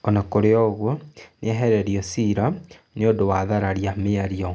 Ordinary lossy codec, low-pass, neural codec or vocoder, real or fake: none; none; none; real